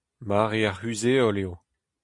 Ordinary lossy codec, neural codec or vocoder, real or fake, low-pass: MP3, 64 kbps; none; real; 10.8 kHz